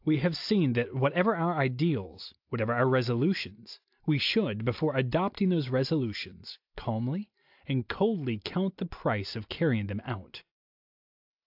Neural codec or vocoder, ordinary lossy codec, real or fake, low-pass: none; AAC, 48 kbps; real; 5.4 kHz